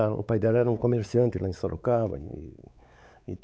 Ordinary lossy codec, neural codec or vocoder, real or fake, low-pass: none; codec, 16 kHz, 4 kbps, X-Codec, WavLM features, trained on Multilingual LibriSpeech; fake; none